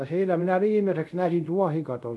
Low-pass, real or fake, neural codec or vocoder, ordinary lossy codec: none; fake; codec, 24 kHz, 0.5 kbps, DualCodec; none